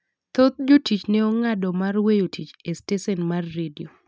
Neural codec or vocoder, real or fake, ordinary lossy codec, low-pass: none; real; none; none